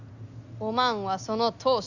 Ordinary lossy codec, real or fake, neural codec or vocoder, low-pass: none; real; none; 7.2 kHz